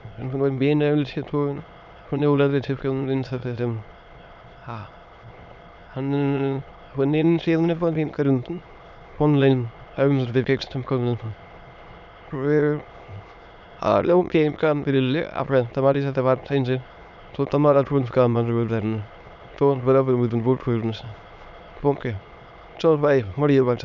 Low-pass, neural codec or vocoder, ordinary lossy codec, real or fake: 7.2 kHz; autoencoder, 22.05 kHz, a latent of 192 numbers a frame, VITS, trained on many speakers; none; fake